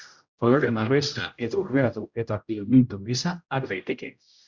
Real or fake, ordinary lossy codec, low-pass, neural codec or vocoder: fake; Opus, 64 kbps; 7.2 kHz; codec, 16 kHz, 0.5 kbps, X-Codec, HuBERT features, trained on general audio